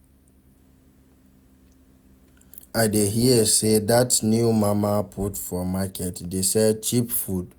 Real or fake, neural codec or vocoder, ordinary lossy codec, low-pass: real; none; none; none